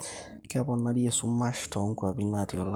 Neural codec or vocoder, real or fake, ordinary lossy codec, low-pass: codec, 44.1 kHz, 7.8 kbps, Pupu-Codec; fake; none; none